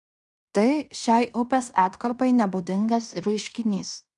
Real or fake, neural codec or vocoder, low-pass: fake; codec, 16 kHz in and 24 kHz out, 0.9 kbps, LongCat-Audio-Codec, fine tuned four codebook decoder; 10.8 kHz